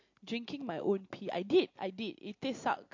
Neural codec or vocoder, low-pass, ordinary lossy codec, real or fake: none; 7.2 kHz; MP3, 48 kbps; real